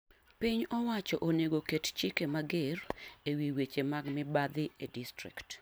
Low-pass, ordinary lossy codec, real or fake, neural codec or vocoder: none; none; real; none